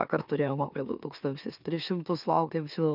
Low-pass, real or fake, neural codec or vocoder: 5.4 kHz; fake; autoencoder, 44.1 kHz, a latent of 192 numbers a frame, MeloTTS